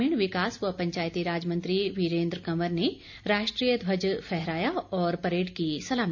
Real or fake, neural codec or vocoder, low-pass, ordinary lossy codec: real; none; 7.2 kHz; none